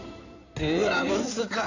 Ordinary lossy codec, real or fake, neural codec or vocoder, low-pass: none; fake; codec, 16 kHz in and 24 kHz out, 2.2 kbps, FireRedTTS-2 codec; 7.2 kHz